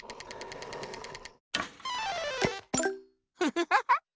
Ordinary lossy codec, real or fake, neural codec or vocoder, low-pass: none; real; none; none